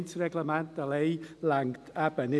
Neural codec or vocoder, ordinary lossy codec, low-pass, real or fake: none; none; none; real